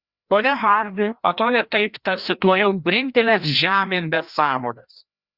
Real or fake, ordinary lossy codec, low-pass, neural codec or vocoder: fake; Opus, 64 kbps; 5.4 kHz; codec, 16 kHz, 1 kbps, FreqCodec, larger model